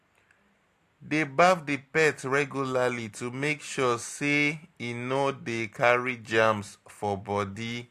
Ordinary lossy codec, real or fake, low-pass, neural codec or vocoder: AAC, 64 kbps; real; 14.4 kHz; none